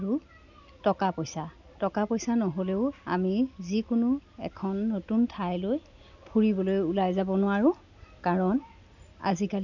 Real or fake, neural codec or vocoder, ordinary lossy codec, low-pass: real; none; none; 7.2 kHz